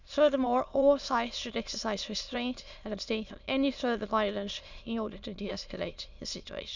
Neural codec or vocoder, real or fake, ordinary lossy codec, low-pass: autoencoder, 22.05 kHz, a latent of 192 numbers a frame, VITS, trained on many speakers; fake; none; 7.2 kHz